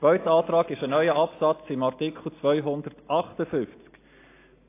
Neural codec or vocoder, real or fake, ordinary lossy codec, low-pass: none; real; AAC, 24 kbps; 3.6 kHz